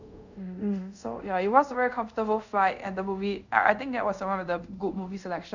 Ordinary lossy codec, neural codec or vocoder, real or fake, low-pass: none; codec, 24 kHz, 0.5 kbps, DualCodec; fake; 7.2 kHz